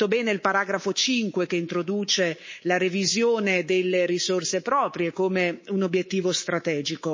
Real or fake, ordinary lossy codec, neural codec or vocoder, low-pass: fake; MP3, 32 kbps; codec, 24 kHz, 3.1 kbps, DualCodec; 7.2 kHz